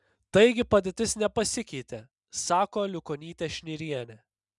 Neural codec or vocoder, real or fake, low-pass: none; real; 10.8 kHz